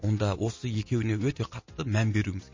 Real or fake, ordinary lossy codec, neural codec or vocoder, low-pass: real; MP3, 32 kbps; none; 7.2 kHz